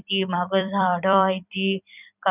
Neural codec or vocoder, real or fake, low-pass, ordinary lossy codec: vocoder, 44.1 kHz, 128 mel bands every 256 samples, BigVGAN v2; fake; 3.6 kHz; none